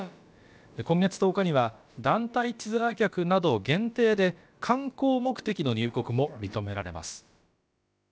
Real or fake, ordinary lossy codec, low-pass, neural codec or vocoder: fake; none; none; codec, 16 kHz, about 1 kbps, DyCAST, with the encoder's durations